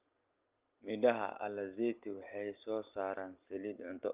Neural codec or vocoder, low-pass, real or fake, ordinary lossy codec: none; 3.6 kHz; real; Opus, 24 kbps